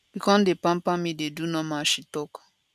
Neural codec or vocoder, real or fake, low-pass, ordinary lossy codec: none; real; 14.4 kHz; none